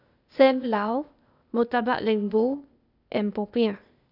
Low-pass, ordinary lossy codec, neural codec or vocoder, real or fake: 5.4 kHz; none; codec, 16 kHz, 0.8 kbps, ZipCodec; fake